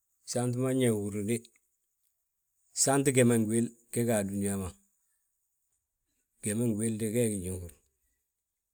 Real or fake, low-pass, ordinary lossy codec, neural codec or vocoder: real; none; none; none